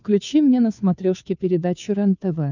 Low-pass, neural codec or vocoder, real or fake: 7.2 kHz; codec, 16 kHz, 2 kbps, FunCodec, trained on Chinese and English, 25 frames a second; fake